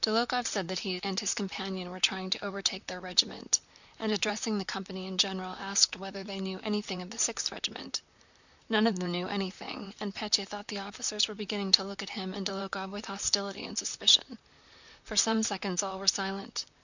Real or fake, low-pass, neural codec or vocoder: fake; 7.2 kHz; vocoder, 44.1 kHz, 128 mel bands, Pupu-Vocoder